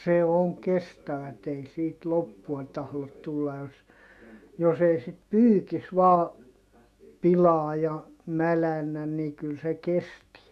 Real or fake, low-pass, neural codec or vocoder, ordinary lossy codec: fake; 14.4 kHz; autoencoder, 48 kHz, 128 numbers a frame, DAC-VAE, trained on Japanese speech; none